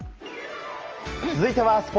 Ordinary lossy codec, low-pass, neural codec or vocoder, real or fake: Opus, 24 kbps; 7.2 kHz; none; real